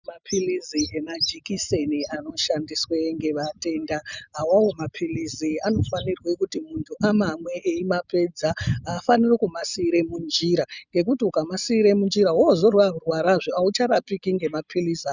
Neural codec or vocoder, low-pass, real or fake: none; 7.2 kHz; real